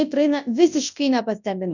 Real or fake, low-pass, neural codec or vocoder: fake; 7.2 kHz; codec, 24 kHz, 0.9 kbps, WavTokenizer, large speech release